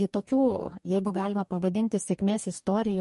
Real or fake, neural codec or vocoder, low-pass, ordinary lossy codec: fake; codec, 44.1 kHz, 2.6 kbps, DAC; 14.4 kHz; MP3, 48 kbps